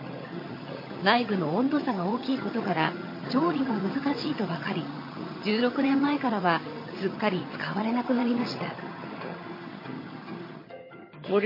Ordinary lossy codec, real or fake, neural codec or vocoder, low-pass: MP3, 24 kbps; fake; vocoder, 22.05 kHz, 80 mel bands, HiFi-GAN; 5.4 kHz